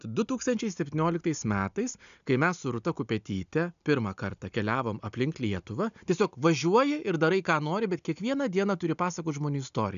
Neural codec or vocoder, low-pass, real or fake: none; 7.2 kHz; real